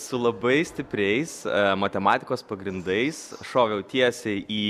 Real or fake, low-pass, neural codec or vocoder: real; 14.4 kHz; none